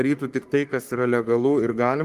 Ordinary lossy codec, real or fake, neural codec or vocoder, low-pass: Opus, 24 kbps; fake; codec, 44.1 kHz, 3.4 kbps, Pupu-Codec; 14.4 kHz